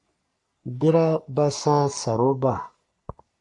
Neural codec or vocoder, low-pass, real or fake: codec, 44.1 kHz, 3.4 kbps, Pupu-Codec; 10.8 kHz; fake